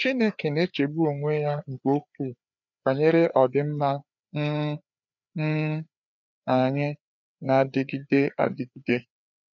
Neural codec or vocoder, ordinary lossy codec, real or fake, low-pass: codec, 16 kHz, 4 kbps, FreqCodec, larger model; none; fake; 7.2 kHz